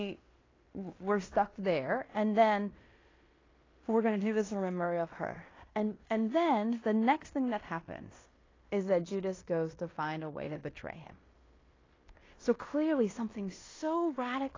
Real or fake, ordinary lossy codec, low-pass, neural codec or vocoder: fake; AAC, 32 kbps; 7.2 kHz; codec, 16 kHz in and 24 kHz out, 0.9 kbps, LongCat-Audio-Codec, fine tuned four codebook decoder